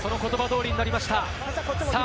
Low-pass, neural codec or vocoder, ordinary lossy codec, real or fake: none; none; none; real